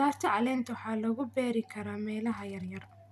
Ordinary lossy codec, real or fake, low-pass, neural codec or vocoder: none; real; 14.4 kHz; none